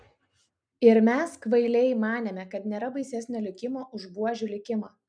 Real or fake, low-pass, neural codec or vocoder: real; 9.9 kHz; none